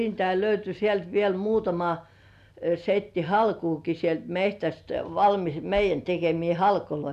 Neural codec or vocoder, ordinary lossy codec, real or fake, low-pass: none; none; real; 14.4 kHz